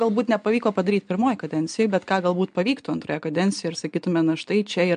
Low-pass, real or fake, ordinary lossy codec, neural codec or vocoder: 9.9 kHz; real; MP3, 64 kbps; none